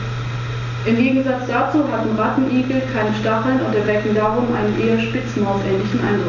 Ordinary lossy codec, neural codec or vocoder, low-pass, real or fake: none; none; 7.2 kHz; real